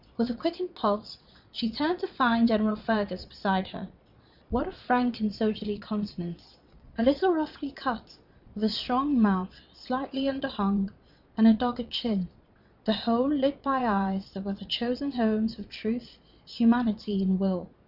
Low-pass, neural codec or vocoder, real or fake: 5.4 kHz; vocoder, 22.05 kHz, 80 mel bands, Vocos; fake